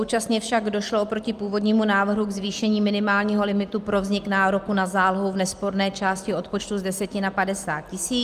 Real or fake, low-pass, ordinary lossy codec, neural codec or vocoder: real; 14.4 kHz; Opus, 32 kbps; none